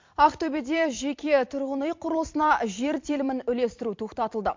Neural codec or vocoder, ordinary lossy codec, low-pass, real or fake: vocoder, 44.1 kHz, 128 mel bands every 256 samples, BigVGAN v2; MP3, 48 kbps; 7.2 kHz; fake